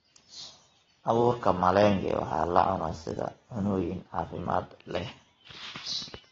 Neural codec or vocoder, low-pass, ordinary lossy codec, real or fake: none; 7.2 kHz; AAC, 24 kbps; real